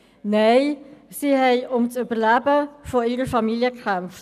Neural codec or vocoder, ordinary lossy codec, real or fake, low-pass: none; none; real; 14.4 kHz